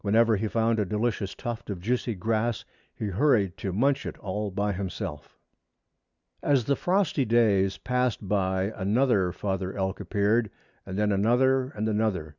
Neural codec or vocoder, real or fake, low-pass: none; real; 7.2 kHz